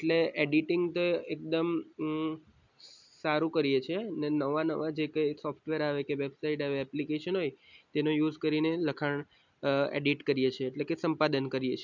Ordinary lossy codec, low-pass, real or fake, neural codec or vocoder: none; 7.2 kHz; real; none